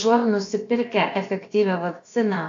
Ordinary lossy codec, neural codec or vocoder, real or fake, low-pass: AAC, 48 kbps; codec, 16 kHz, about 1 kbps, DyCAST, with the encoder's durations; fake; 7.2 kHz